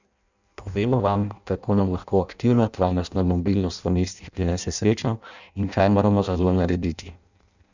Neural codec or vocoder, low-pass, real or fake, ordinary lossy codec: codec, 16 kHz in and 24 kHz out, 0.6 kbps, FireRedTTS-2 codec; 7.2 kHz; fake; none